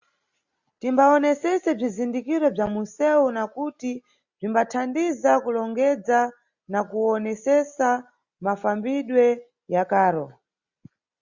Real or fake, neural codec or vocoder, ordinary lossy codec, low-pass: real; none; Opus, 64 kbps; 7.2 kHz